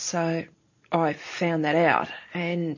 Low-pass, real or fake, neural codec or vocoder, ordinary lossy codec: 7.2 kHz; real; none; MP3, 32 kbps